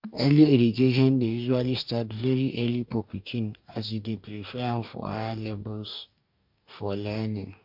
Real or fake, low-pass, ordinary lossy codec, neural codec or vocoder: fake; 5.4 kHz; MP3, 48 kbps; codec, 44.1 kHz, 2.6 kbps, DAC